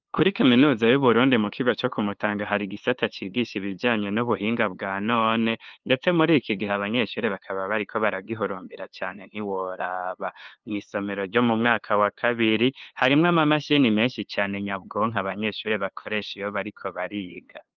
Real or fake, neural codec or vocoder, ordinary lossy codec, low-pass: fake; codec, 16 kHz, 2 kbps, FunCodec, trained on LibriTTS, 25 frames a second; Opus, 24 kbps; 7.2 kHz